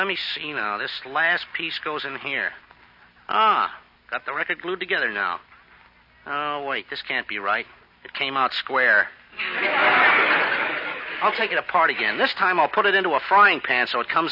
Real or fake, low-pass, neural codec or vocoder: real; 5.4 kHz; none